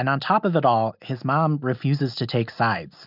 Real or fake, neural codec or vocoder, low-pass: real; none; 5.4 kHz